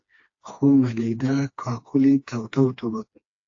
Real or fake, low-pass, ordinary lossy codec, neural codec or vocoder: fake; 7.2 kHz; AAC, 48 kbps; codec, 16 kHz, 2 kbps, FreqCodec, smaller model